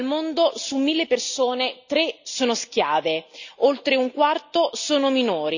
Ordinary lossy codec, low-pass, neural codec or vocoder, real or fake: none; 7.2 kHz; none; real